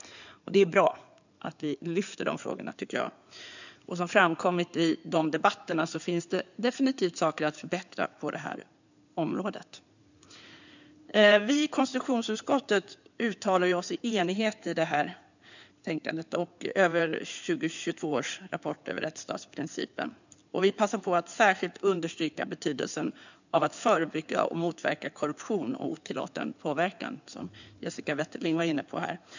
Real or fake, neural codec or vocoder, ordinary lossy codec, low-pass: fake; codec, 16 kHz in and 24 kHz out, 2.2 kbps, FireRedTTS-2 codec; none; 7.2 kHz